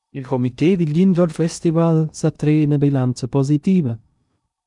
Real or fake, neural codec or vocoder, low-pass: fake; codec, 16 kHz in and 24 kHz out, 0.6 kbps, FocalCodec, streaming, 2048 codes; 10.8 kHz